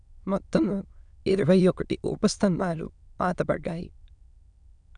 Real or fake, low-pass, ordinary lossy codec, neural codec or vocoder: fake; 9.9 kHz; none; autoencoder, 22.05 kHz, a latent of 192 numbers a frame, VITS, trained on many speakers